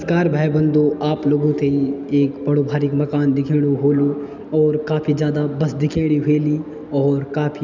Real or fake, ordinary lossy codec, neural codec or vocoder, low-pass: real; none; none; 7.2 kHz